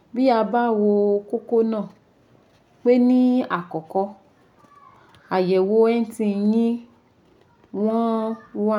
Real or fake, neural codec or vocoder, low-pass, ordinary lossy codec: real; none; 19.8 kHz; none